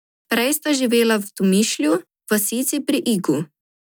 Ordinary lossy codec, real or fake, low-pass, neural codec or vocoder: none; real; none; none